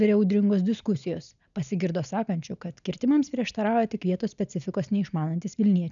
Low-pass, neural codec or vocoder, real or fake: 7.2 kHz; none; real